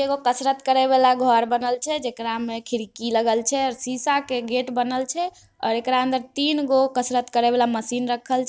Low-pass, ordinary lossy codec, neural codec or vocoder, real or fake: none; none; none; real